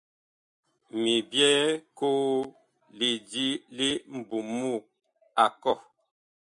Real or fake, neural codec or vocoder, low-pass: real; none; 10.8 kHz